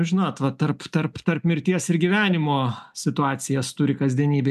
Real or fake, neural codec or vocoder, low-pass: real; none; 14.4 kHz